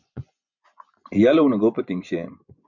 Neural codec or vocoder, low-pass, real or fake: vocoder, 24 kHz, 100 mel bands, Vocos; 7.2 kHz; fake